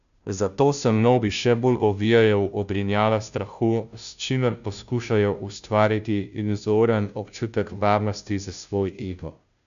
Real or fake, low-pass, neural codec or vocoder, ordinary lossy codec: fake; 7.2 kHz; codec, 16 kHz, 0.5 kbps, FunCodec, trained on Chinese and English, 25 frames a second; MP3, 96 kbps